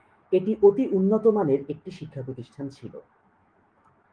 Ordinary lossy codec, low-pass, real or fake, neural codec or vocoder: Opus, 24 kbps; 9.9 kHz; fake; autoencoder, 48 kHz, 128 numbers a frame, DAC-VAE, trained on Japanese speech